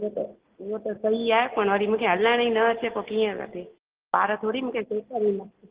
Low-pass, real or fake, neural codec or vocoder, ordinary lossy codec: 3.6 kHz; real; none; Opus, 16 kbps